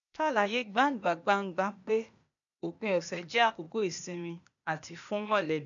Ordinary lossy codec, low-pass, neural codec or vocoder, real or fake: none; 7.2 kHz; codec, 16 kHz, 0.8 kbps, ZipCodec; fake